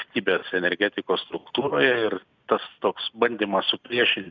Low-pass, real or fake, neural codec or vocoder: 7.2 kHz; real; none